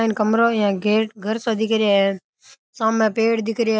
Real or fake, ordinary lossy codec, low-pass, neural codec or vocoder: real; none; none; none